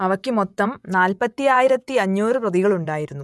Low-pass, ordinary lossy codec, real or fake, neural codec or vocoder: none; none; fake; vocoder, 24 kHz, 100 mel bands, Vocos